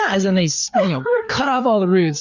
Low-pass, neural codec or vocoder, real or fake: 7.2 kHz; codec, 16 kHz, 4 kbps, FreqCodec, larger model; fake